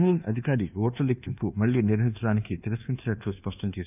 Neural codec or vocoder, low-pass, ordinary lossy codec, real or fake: codec, 24 kHz, 1.2 kbps, DualCodec; 3.6 kHz; none; fake